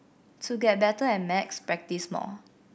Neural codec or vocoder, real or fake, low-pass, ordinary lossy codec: none; real; none; none